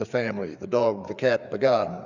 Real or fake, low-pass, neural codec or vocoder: fake; 7.2 kHz; codec, 16 kHz, 4 kbps, FreqCodec, larger model